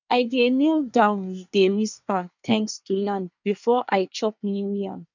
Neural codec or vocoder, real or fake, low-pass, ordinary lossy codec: codec, 24 kHz, 1 kbps, SNAC; fake; 7.2 kHz; none